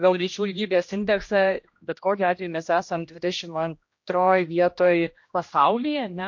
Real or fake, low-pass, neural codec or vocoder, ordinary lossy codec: fake; 7.2 kHz; codec, 16 kHz, 1 kbps, X-Codec, HuBERT features, trained on general audio; MP3, 48 kbps